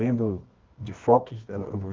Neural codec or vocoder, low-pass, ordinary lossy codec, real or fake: codec, 24 kHz, 0.9 kbps, WavTokenizer, medium music audio release; 7.2 kHz; Opus, 16 kbps; fake